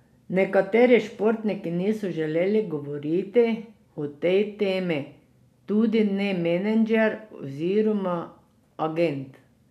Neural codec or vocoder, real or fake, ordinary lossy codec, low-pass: none; real; none; 14.4 kHz